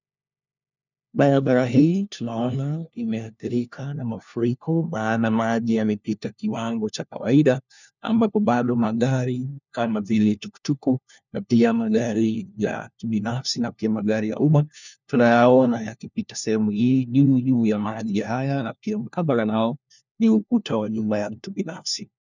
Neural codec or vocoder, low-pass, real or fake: codec, 16 kHz, 1 kbps, FunCodec, trained on LibriTTS, 50 frames a second; 7.2 kHz; fake